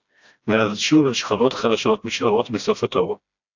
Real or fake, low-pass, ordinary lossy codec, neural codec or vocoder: fake; 7.2 kHz; AAC, 48 kbps; codec, 16 kHz, 1 kbps, FreqCodec, smaller model